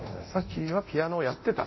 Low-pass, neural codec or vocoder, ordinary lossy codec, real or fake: 7.2 kHz; codec, 24 kHz, 0.9 kbps, DualCodec; MP3, 24 kbps; fake